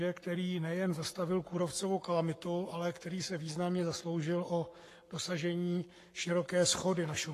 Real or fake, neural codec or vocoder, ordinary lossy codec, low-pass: fake; codec, 44.1 kHz, 7.8 kbps, Pupu-Codec; AAC, 48 kbps; 14.4 kHz